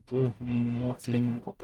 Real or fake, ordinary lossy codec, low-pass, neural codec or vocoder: fake; Opus, 32 kbps; 19.8 kHz; codec, 44.1 kHz, 0.9 kbps, DAC